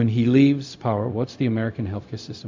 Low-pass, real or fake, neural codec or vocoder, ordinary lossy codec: 7.2 kHz; fake; codec, 16 kHz, 0.4 kbps, LongCat-Audio-Codec; MP3, 64 kbps